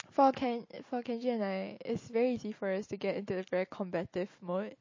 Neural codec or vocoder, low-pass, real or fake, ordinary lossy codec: none; 7.2 kHz; real; MP3, 32 kbps